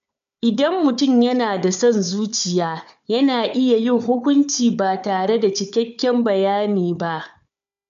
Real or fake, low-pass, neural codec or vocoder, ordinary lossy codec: fake; 7.2 kHz; codec, 16 kHz, 4 kbps, FunCodec, trained on Chinese and English, 50 frames a second; MP3, 64 kbps